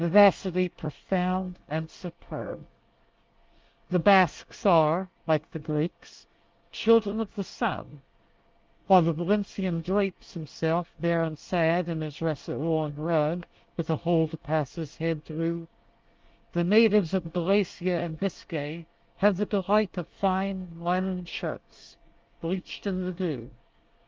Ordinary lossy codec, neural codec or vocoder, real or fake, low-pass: Opus, 32 kbps; codec, 24 kHz, 1 kbps, SNAC; fake; 7.2 kHz